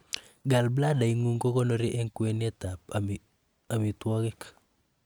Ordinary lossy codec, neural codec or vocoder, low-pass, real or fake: none; none; none; real